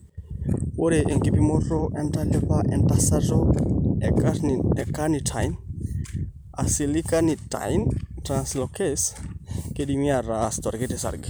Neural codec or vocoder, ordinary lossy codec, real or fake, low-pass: vocoder, 44.1 kHz, 128 mel bands every 256 samples, BigVGAN v2; none; fake; none